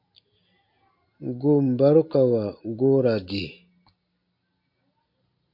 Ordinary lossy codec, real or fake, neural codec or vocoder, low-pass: MP3, 32 kbps; real; none; 5.4 kHz